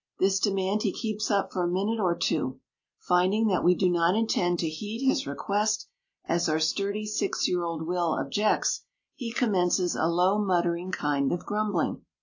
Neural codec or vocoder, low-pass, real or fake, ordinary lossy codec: none; 7.2 kHz; real; AAC, 48 kbps